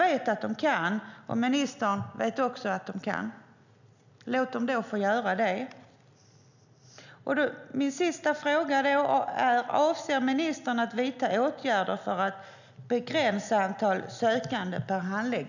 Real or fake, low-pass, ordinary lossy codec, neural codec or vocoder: real; 7.2 kHz; none; none